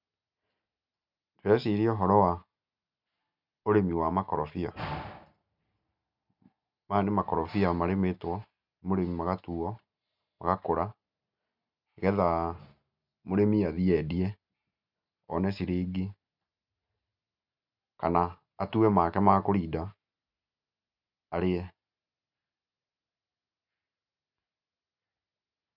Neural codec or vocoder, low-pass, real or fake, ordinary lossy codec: none; 5.4 kHz; real; none